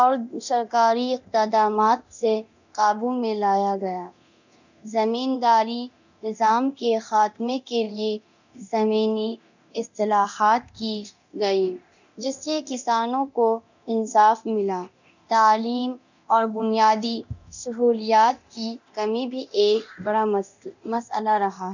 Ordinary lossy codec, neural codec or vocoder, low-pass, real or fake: none; codec, 24 kHz, 0.9 kbps, DualCodec; 7.2 kHz; fake